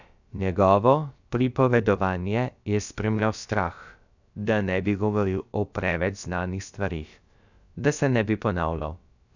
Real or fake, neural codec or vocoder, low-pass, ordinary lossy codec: fake; codec, 16 kHz, about 1 kbps, DyCAST, with the encoder's durations; 7.2 kHz; none